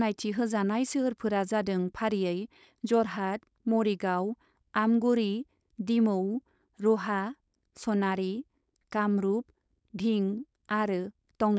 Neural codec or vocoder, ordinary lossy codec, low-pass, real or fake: codec, 16 kHz, 4.8 kbps, FACodec; none; none; fake